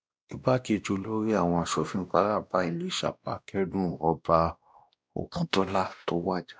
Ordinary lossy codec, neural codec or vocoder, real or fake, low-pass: none; codec, 16 kHz, 1 kbps, X-Codec, WavLM features, trained on Multilingual LibriSpeech; fake; none